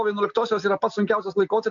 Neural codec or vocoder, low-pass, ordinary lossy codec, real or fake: none; 7.2 kHz; MP3, 64 kbps; real